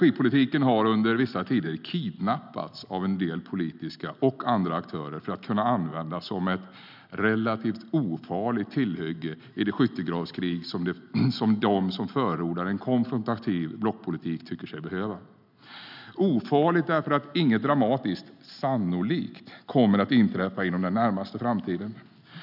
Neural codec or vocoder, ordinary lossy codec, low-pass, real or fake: none; none; 5.4 kHz; real